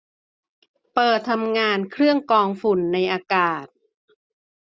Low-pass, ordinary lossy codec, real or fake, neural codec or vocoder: none; none; real; none